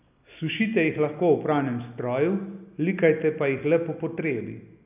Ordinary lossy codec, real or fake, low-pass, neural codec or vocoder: none; real; 3.6 kHz; none